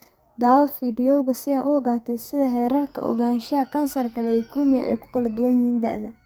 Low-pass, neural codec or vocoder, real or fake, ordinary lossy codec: none; codec, 44.1 kHz, 2.6 kbps, SNAC; fake; none